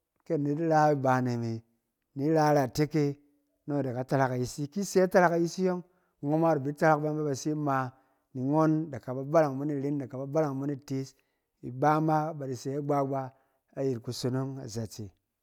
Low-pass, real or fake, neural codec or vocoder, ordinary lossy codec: 19.8 kHz; real; none; none